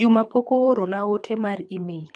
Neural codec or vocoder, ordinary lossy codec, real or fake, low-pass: codec, 24 kHz, 3 kbps, HILCodec; none; fake; 9.9 kHz